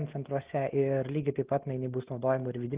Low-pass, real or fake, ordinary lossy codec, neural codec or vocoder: 3.6 kHz; real; Opus, 24 kbps; none